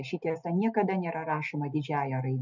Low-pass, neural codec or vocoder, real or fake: 7.2 kHz; none; real